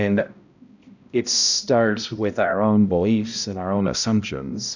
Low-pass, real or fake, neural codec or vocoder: 7.2 kHz; fake; codec, 16 kHz, 0.5 kbps, X-Codec, HuBERT features, trained on balanced general audio